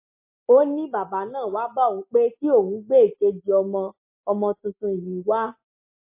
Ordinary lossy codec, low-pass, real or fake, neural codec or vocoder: MP3, 24 kbps; 3.6 kHz; real; none